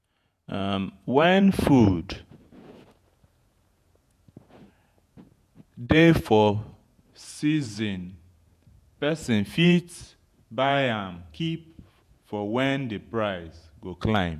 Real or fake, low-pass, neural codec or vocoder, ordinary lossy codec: fake; 14.4 kHz; vocoder, 48 kHz, 128 mel bands, Vocos; none